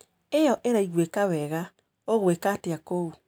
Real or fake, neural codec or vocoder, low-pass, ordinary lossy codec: real; none; none; none